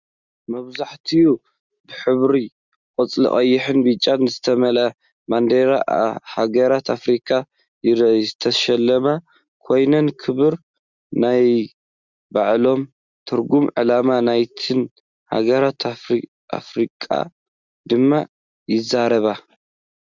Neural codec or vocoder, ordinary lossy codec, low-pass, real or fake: none; Opus, 64 kbps; 7.2 kHz; real